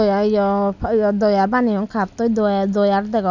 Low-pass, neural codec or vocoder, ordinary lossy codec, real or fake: 7.2 kHz; none; none; real